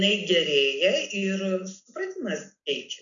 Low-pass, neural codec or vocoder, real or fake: 7.2 kHz; none; real